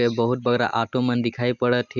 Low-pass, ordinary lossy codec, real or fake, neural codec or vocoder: 7.2 kHz; none; real; none